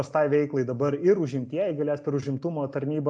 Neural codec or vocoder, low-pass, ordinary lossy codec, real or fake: none; 9.9 kHz; MP3, 64 kbps; real